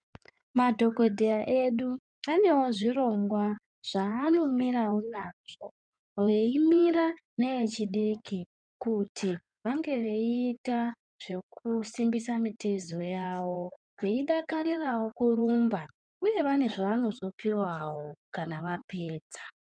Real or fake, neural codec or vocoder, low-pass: fake; codec, 16 kHz in and 24 kHz out, 2.2 kbps, FireRedTTS-2 codec; 9.9 kHz